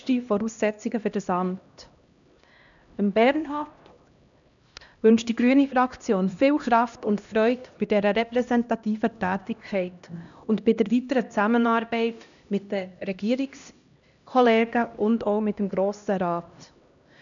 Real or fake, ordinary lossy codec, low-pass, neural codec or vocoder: fake; none; 7.2 kHz; codec, 16 kHz, 1 kbps, X-Codec, HuBERT features, trained on LibriSpeech